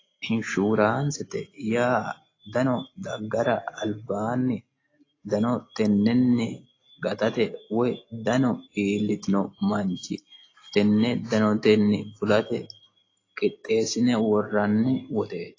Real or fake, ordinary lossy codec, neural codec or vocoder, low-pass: real; AAC, 32 kbps; none; 7.2 kHz